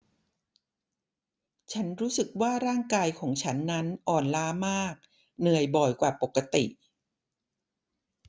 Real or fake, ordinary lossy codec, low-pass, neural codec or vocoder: real; none; none; none